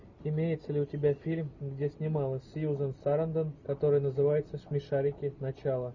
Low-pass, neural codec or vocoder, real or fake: 7.2 kHz; none; real